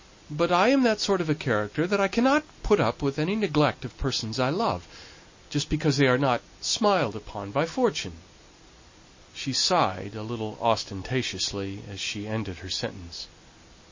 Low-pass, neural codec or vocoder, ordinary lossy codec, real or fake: 7.2 kHz; none; MP3, 32 kbps; real